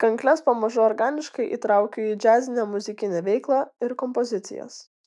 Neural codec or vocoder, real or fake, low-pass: autoencoder, 48 kHz, 128 numbers a frame, DAC-VAE, trained on Japanese speech; fake; 10.8 kHz